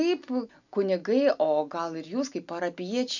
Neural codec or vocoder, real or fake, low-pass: none; real; 7.2 kHz